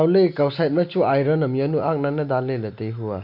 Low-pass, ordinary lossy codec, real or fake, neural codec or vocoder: 5.4 kHz; none; real; none